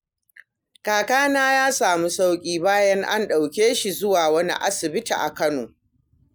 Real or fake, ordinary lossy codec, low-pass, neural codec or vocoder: real; none; none; none